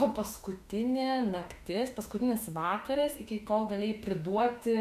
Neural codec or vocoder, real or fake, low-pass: autoencoder, 48 kHz, 32 numbers a frame, DAC-VAE, trained on Japanese speech; fake; 14.4 kHz